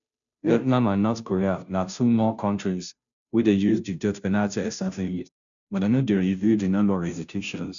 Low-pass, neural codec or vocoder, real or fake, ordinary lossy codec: 7.2 kHz; codec, 16 kHz, 0.5 kbps, FunCodec, trained on Chinese and English, 25 frames a second; fake; none